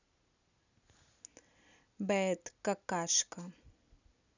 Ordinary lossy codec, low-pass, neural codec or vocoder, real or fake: none; 7.2 kHz; none; real